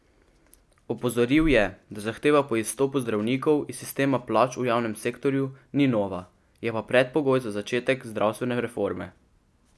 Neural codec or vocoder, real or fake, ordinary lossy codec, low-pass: none; real; none; none